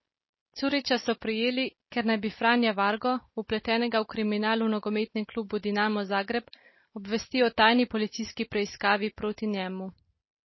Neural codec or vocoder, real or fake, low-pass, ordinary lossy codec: none; real; 7.2 kHz; MP3, 24 kbps